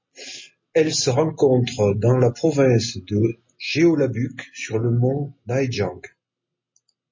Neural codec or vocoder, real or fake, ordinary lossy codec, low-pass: none; real; MP3, 32 kbps; 7.2 kHz